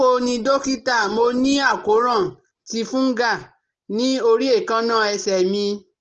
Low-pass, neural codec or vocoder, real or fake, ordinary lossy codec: 7.2 kHz; none; real; Opus, 16 kbps